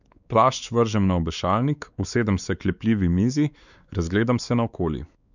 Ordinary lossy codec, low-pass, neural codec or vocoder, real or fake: none; 7.2 kHz; codec, 16 kHz, 4 kbps, X-Codec, WavLM features, trained on Multilingual LibriSpeech; fake